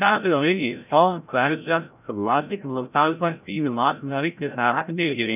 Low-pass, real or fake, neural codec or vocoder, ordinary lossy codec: 3.6 kHz; fake; codec, 16 kHz, 0.5 kbps, FreqCodec, larger model; none